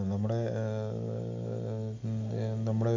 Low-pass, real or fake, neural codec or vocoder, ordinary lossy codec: 7.2 kHz; real; none; MP3, 64 kbps